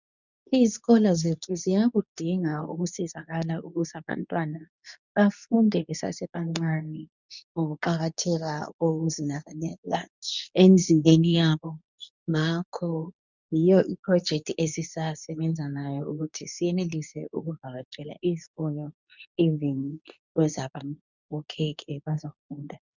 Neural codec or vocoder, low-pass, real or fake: codec, 24 kHz, 0.9 kbps, WavTokenizer, medium speech release version 2; 7.2 kHz; fake